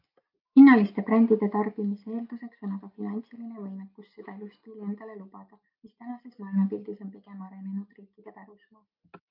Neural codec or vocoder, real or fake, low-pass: none; real; 5.4 kHz